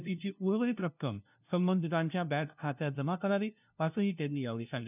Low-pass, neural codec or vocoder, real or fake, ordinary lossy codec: 3.6 kHz; codec, 16 kHz, 0.5 kbps, FunCodec, trained on LibriTTS, 25 frames a second; fake; none